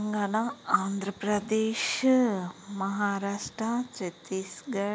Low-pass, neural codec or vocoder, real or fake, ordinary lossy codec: none; none; real; none